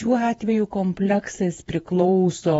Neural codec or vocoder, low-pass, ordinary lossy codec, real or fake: vocoder, 48 kHz, 128 mel bands, Vocos; 19.8 kHz; AAC, 24 kbps; fake